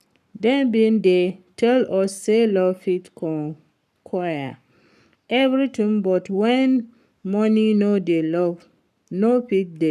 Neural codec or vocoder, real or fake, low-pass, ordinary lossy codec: codec, 44.1 kHz, 7.8 kbps, Pupu-Codec; fake; 14.4 kHz; none